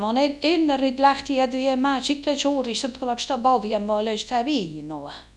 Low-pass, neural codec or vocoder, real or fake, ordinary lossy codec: none; codec, 24 kHz, 0.9 kbps, WavTokenizer, large speech release; fake; none